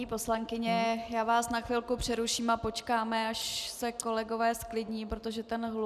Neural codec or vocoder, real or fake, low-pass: none; real; 14.4 kHz